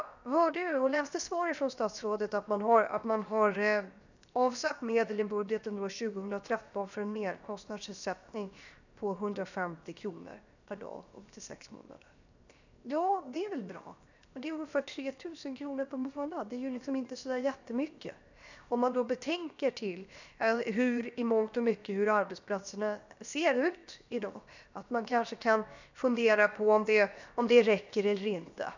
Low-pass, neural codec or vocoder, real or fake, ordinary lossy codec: 7.2 kHz; codec, 16 kHz, 0.7 kbps, FocalCodec; fake; none